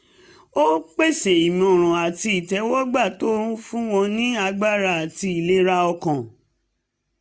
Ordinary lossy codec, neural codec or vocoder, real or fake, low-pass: none; none; real; none